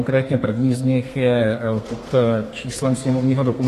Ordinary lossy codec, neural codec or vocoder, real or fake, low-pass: AAC, 48 kbps; codec, 32 kHz, 1.9 kbps, SNAC; fake; 14.4 kHz